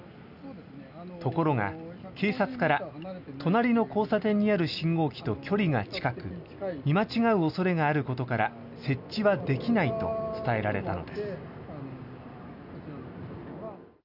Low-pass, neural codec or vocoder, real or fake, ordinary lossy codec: 5.4 kHz; none; real; none